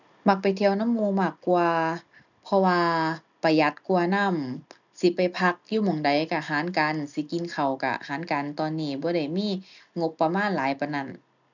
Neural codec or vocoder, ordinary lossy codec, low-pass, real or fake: none; none; 7.2 kHz; real